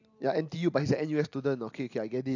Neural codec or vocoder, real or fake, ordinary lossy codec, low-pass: none; real; AAC, 48 kbps; 7.2 kHz